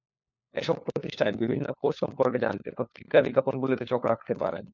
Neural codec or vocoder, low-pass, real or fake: codec, 16 kHz, 4 kbps, FunCodec, trained on LibriTTS, 50 frames a second; 7.2 kHz; fake